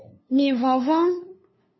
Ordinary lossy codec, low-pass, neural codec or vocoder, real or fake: MP3, 24 kbps; 7.2 kHz; codec, 16 kHz, 2 kbps, FunCodec, trained on LibriTTS, 25 frames a second; fake